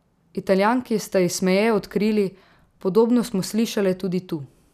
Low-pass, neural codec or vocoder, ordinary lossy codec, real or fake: 14.4 kHz; none; none; real